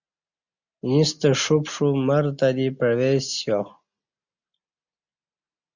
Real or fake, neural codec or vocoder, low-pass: real; none; 7.2 kHz